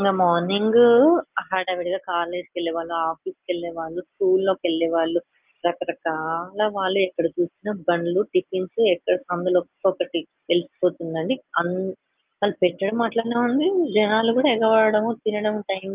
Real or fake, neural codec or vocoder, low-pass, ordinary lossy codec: real; none; 3.6 kHz; Opus, 32 kbps